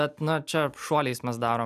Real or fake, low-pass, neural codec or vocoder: real; 14.4 kHz; none